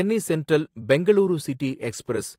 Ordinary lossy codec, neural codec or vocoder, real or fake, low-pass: AAC, 48 kbps; vocoder, 44.1 kHz, 128 mel bands every 256 samples, BigVGAN v2; fake; 19.8 kHz